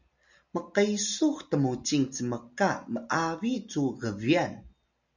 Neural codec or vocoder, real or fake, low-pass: none; real; 7.2 kHz